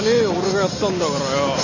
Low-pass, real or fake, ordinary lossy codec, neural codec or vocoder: 7.2 kHz; real; none; none